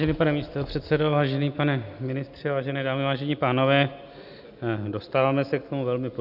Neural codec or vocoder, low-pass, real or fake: none; 5.4 kHz; real